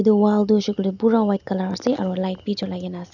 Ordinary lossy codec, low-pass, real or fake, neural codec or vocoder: none; 7.2 kHz; real; none